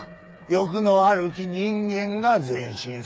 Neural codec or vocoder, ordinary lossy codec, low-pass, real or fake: codec, 16 kHz, 4 kbps, FreqCodec, smaller model; none; none; fake